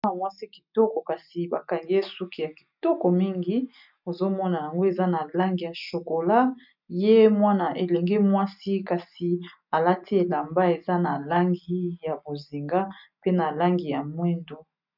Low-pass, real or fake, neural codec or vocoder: 5.4 kHz; real; none